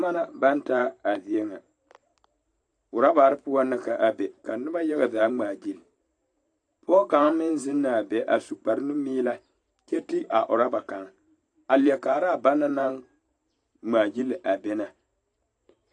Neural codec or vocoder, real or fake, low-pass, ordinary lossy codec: vocoder, 44.1 kHz, 128 mel bands every 512 samples, BigVGAN v2; fake; 9.9 kHz; AAC, 48 kbps